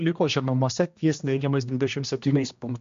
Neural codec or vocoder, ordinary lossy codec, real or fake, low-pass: codec, 16 kHz, 1 kbps, X-Codec, HuBERT features, trained on general audio; MP3, 48 kbps; fake; 7.2 kHz